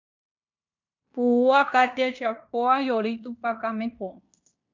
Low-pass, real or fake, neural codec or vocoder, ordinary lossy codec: 7.2 kHz; fake; codec, 16 kHz in and 24 kHz out, 0.9 kbps, LongCat-Audio-Codec, fine tuned four codebook decoder; MP3, 64 kbps